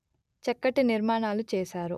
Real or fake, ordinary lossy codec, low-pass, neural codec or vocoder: real; none; 14.4 kHz; none